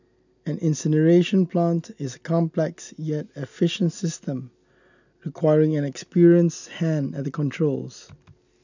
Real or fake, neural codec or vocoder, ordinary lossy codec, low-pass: real; none; none; 7.2 kHz